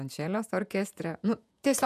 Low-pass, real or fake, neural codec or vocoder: 14.4 kHz; fake; vocoder, 48 kHz, 128 mel bands, Vocos